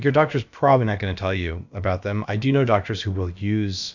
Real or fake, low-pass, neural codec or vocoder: fake; 7.2 kHz; codec, 16 kHz, about 1 kbps, DyCAST, with the encoder's durations